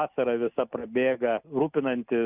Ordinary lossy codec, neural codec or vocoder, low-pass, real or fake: Opus, 64 kbps; none; 3.6 kHz; real